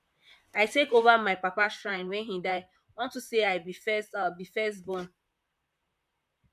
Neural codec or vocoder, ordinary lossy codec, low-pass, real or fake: vocoder, 44.1 kHz, 128 mel bands, Pupu-Vocoder; MP3, 96 kbps; 14.4 kHz; fake